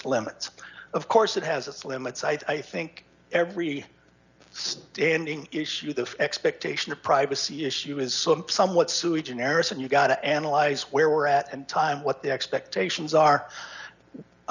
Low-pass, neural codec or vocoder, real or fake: 7.2 kHz; none; real